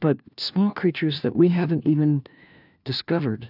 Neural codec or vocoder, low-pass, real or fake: codec, 16 kHz, 1 kbps, FunCodec, trained on LibriTTS, 50 frames a second; 5.4 kHz; fake